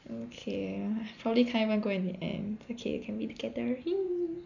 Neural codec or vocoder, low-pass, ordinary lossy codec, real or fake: none; 7.2 kHz; none; real